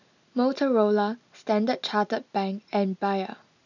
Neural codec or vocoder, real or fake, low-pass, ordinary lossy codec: none; real; 7.2 kHz; none